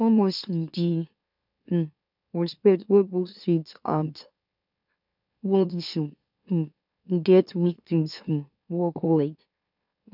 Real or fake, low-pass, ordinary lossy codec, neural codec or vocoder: fake; 5.4 kHz; none; autoencoder, 44.1 kHz, a latent of 192 numbers a frame, MeloTTS